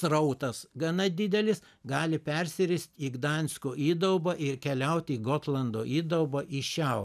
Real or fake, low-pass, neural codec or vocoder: real; 14.4 kHz; none